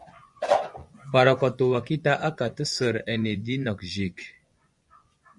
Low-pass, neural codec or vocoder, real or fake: 10.8 kHz; vocoder, 24 kHz, 100 mel bands, Vocos; fake